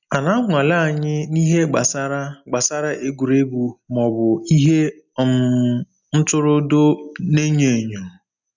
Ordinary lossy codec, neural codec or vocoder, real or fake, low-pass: none; none; real; 7.2 kHz